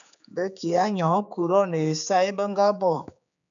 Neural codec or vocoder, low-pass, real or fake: codec, 16 kHz, 4 kbps, X-Codec, HuBERT features, trained on general audio; 7.2 kHz; fake